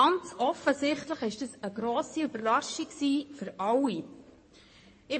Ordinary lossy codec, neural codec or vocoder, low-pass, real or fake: MP3, 32 kbps; codec, 16 kHz in and 24 kHz out, 2.2 kbps, FireRedTTS-2 codec; 9.9 kHz; fake